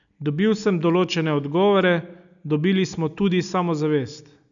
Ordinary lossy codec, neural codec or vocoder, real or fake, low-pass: none; none; real; 7.2 kHz